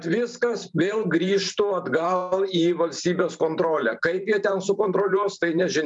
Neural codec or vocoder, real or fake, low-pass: vocoder, 44.1 kHz, 128 mel bands every 512 samples, BigVGAN v2; fake; 10.8 kHz